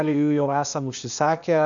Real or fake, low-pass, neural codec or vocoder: fake; 7.2 kHz; codec, 16 kHz, 0.8 kbps, ZipCodec